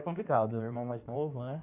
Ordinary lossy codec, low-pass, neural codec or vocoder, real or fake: none; 3.6 kHz; codec, 16 kHz in and 24 kHz out, 1.1 kbps, FireRedTTS-2 codec; fake